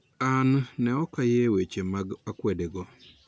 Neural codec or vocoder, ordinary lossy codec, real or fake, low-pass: none; none; real; none